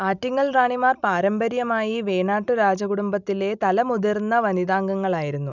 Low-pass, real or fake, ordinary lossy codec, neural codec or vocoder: 7.2 kHz; real; none; none